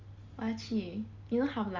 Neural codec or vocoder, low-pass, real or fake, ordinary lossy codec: none; 7.2 kHz; real; Opus, 32 kbps